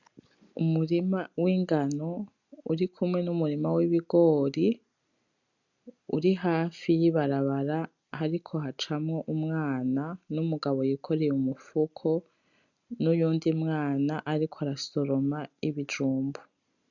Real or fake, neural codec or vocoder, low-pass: real; none; 7.2 kHz